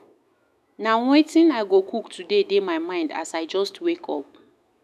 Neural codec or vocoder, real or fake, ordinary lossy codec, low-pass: autoencoder, 48 kHz, 128 numbers a frame, DAC-VAE, trained on Japanese speech; fake; none; 14.4 kHz